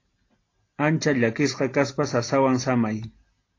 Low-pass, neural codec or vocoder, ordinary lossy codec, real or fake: 7.2 kHz; none; AAC, 32 kbps; real